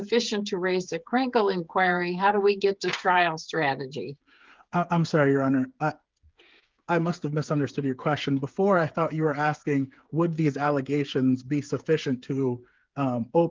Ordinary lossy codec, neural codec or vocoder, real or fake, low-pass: Opus, 32 kbps; codec, 16 kHz, 8 kbps, FreqCodec, smaller model; fake; 7.2 kHz